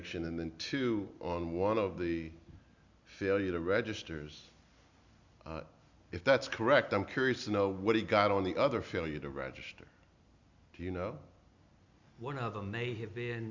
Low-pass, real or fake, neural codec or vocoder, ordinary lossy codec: 7.2 kHz; real; none; Opus, 64 kbps